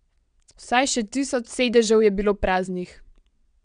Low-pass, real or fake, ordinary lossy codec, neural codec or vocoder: 9.9 kHz; fake; none; vocoder, 22.05 kHz, 80 mel bands, WaveNeXt